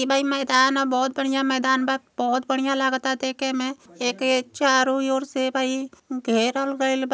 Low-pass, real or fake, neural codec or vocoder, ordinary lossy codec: none; real; none; none